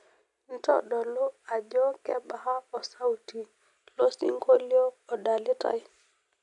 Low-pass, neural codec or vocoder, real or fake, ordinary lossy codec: 10.8 kHz; none; real; none